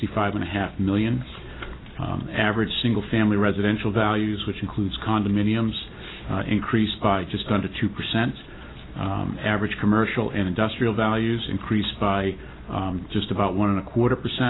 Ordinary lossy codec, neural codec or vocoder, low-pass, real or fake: AAC, 16 kbps; none; 7.2 kHz; real